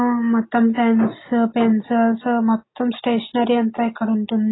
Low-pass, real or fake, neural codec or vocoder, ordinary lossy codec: 7.2 kHz; real; none; AAC, 16 kbps